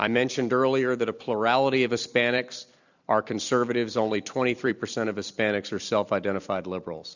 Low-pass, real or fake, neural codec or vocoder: 7.2 kHz; fake; vocoder, 44.1 kHz, 128 mel bands every 256 samples, BigVGAN v2